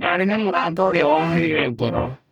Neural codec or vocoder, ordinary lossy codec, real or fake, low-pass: codec, 44.1 kHz, 0.9 kbps, DAC; none; fake; 19.8 kHz